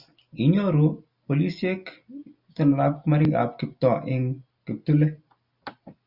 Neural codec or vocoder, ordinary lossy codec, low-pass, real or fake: none; Opus, 64 kbps; 5.4 kHz; real